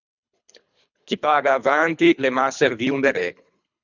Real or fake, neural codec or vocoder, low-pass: fake; codec, 24 kHz, 3 kbps, HILCodec; 7.2 kHz